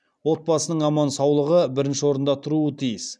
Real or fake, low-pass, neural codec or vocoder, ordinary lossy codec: real; 9.9 kHz; none; none